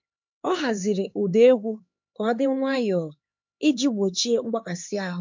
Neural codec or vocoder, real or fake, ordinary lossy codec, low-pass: codec, 16 kHz, 4 kbps, X-Codec, HuBERT features, trained on LibriSpeech; fake; MP3, 48 kbps; 7.2 kHz